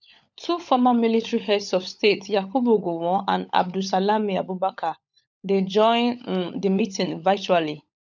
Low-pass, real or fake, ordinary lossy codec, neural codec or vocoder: 7.2 kHz; fake; none; codec, 16 kHz, 16 kbps, FunCodec, trained on LibriTTS, 50 frames a second